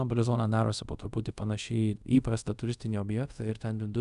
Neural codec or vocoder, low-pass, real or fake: codec, 24 kHz, 0.5 kbps, DualCodec; 10.8 kHz; fake